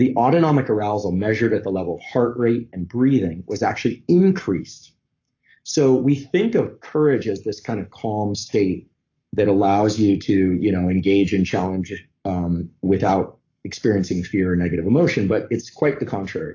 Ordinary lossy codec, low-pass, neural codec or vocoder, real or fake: AAC, 48 kbps; 7.2 kHz; codec, 44.1 kHz, 7.8 kbps, Pupu-Codec; fake